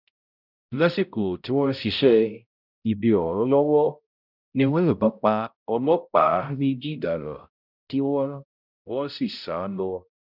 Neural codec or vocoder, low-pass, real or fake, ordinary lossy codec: codec, 16 kHz, 0.5 kbps, X-Codec, HuBERT features, trained on balanced general audio; 5.4 kHz; fake; none